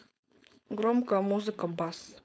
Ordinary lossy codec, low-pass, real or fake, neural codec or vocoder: none; none; fake; codec, 16 kHz, 4.8 kbps, FACodec